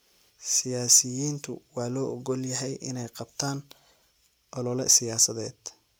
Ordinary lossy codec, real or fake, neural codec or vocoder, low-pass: none; real; none; none